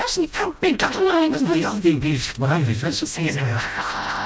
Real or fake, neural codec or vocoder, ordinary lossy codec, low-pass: fake; codec, 16 kHz, 0.5 kbps, FreqCodec, smaller model; none; none